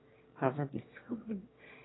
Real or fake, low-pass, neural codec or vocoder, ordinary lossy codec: fake; 7.2 kHz; autoencoder, 22.05 kHz, a latent of 192 numbers a frame, VITS, trained on one speaker; AAC, 16 kbps